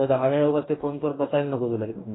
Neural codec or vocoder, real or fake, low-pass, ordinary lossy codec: codec, 44.1 kHz, 2.6 kbps, DAC; fake; 7.2 kHz; AAC, 16 kbps